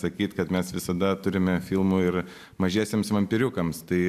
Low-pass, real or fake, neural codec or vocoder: 14.4 kHz; real; none